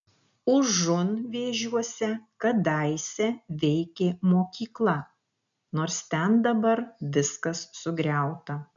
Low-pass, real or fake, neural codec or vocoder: 7.2 kHz; real; none